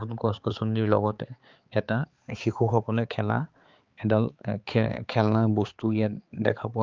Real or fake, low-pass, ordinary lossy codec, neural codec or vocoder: fake; 7.2 kHz; Opus, 24 kbps; codec, 16 kHz, 4 kbps, X-Codec, HuBERT features, trained on balanced general audio